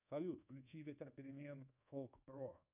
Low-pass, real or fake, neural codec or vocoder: 3.6 kHz; fake; codec, 24 kHz, 1.2 kbps, DualCodec